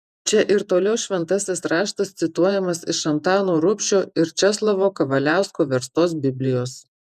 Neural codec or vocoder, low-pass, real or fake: vocoder, 48 kHz, 128 mel bands, Vocos; 14.4 kHz; fake